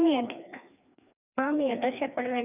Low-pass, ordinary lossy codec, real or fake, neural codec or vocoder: 3.6 kHz; none; fake; codec, 16 kHz, 2 kbps, FreqCodec, larger model